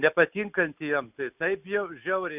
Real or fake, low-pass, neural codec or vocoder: real; 3.6 kHz; none